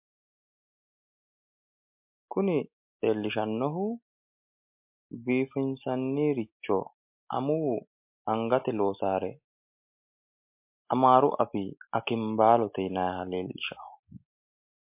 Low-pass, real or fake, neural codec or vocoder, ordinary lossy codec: 3.6 kHz; real; none; MP3, 32 kbps